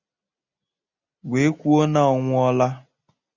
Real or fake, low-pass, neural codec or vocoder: real; 7.2 kHz; none